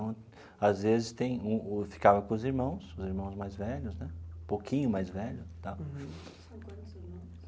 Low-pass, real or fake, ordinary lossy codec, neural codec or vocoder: none; real; none; none